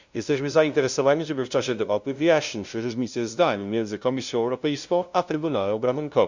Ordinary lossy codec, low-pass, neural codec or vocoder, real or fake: Opus, 64 kbps; 7.2 kHz; codec, 16 kHz, 0.5 kbps, FunCodec, trained on LibriTTS, 25 frames a second; fake